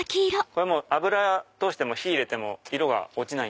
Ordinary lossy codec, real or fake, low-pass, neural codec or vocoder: none; real; none; none